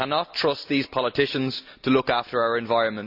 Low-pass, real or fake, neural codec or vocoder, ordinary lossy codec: 5.4 kHz; real; none; none